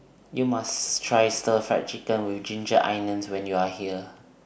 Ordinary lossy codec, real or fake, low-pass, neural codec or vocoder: none; real; none; none